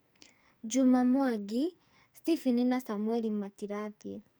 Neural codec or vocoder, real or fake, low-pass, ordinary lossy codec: codec, 44.1 kHz, 2.6 kbps, SNAC; fake; none; none